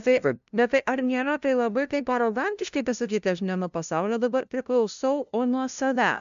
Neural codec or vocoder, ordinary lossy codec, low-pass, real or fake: codec, 16 kHz, 0.5 kbps, FunCodec, trained on LibriTTS, 25 frames a second; AAC, 96 kbps; 7.2 kHz; fake